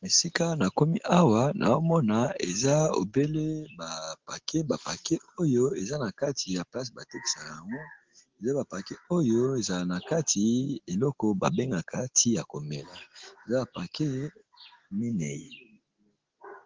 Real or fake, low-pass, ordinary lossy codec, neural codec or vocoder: real; 7.2 kHz; Opus, 16 kbps; none